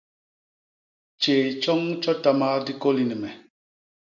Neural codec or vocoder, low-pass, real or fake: none; 7.2 kHz; real